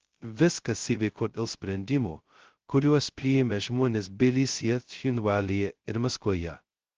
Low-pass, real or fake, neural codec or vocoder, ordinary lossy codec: 7.2 kHz; fake; codec, 16 kHz, 0.2 kbps, FocalCodec; Opus, 16 kbps